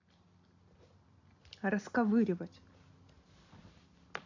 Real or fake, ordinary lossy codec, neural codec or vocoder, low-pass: real; AAC, 48 kbps; none; 7.2 kHz